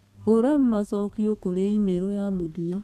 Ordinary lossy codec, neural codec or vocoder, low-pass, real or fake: none; codec, 32 kHz, 1.9 kbps, SNAC; 14.4 kHz; fake